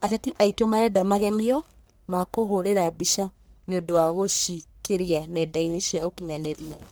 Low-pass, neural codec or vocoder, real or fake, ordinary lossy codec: none; codec, 44.1 kHz, 1.7 kbps, Pupu-Codec; fake; none